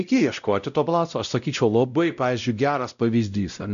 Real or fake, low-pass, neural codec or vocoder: fake; 7.2 kHz; codec, 16 kHz, 0.5 kbps, X-Codec, WavLM features, trained on Multilingual LibriSpeech